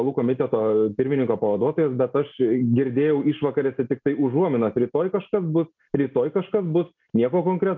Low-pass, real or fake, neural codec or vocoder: 7.2 kHz; real; none